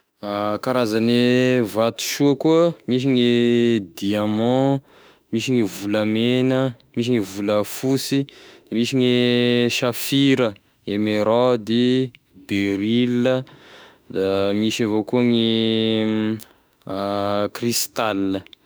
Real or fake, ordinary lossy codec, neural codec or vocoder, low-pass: fake; none; autoencoder, 48 kHz, 32 numbers a frame, DAC-VAE, trained on Japanese speech; none